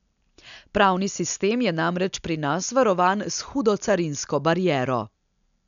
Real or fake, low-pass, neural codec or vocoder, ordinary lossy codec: real; 7.2 kHz; none; none